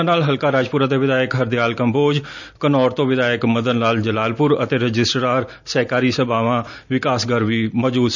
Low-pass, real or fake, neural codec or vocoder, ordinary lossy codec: 7.2 kHz; real; none; none